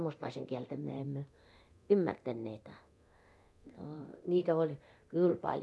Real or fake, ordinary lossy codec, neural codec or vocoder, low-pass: fake; none; codec, 24 kHz, 0.9 kbps, DualCodec; none